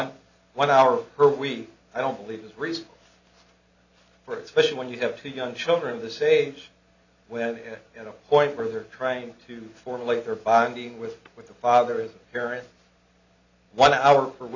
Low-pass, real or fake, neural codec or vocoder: 7.2 kHz; real; none